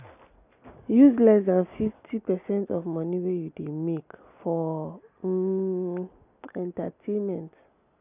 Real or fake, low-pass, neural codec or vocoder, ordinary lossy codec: real; 3.6 kHz; none; none